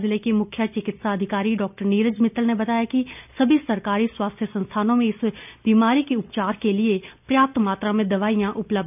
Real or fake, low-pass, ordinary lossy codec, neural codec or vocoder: real; 3.6 kHz; none; none